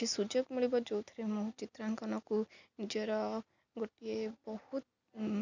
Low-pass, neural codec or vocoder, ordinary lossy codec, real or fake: 7.2 kHz; none; none; real